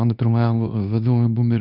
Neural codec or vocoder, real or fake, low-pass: codec, 24 kHz, 0.9 kbps, WavTokenizer, medium speech release version 2; fake; 5.4 kHz